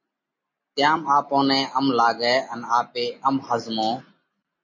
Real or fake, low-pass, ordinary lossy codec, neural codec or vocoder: real; 7.2 kHz; MP3, 32 kbps; none